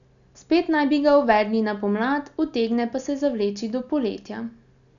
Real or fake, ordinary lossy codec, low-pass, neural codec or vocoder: real; none; 7.2 kHz; none